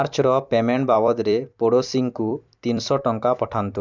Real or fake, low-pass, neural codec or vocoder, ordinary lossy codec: fake; 7.2 kHz; vocoder, 44.1 kHz, 128 mel bands every 256 samples, BigVGAN v2; none